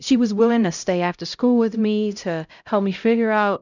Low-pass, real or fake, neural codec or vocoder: 7.2 kHz; fake; codec, 16 kHz, 0.5 kbps, X-Codec, HuBERT features, trained on LibriSpeech